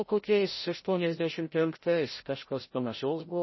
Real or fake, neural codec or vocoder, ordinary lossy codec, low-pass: fake; codec, 16 kHz, 0.5 kbps, FreqCodec, larger model; MP3, 24 kbps; 7.2 kHz